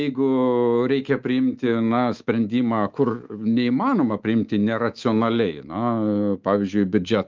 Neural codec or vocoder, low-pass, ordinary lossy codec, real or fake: codec, 24 kHz, 3.1 kbps, DualCodec; 7.2 kHz; Opus, 32 kbps; fake